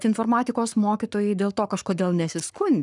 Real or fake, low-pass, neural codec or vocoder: fake; 10.8 kHz; codec, 44.1 kHz, 7.8 kbps, Pupu-Codec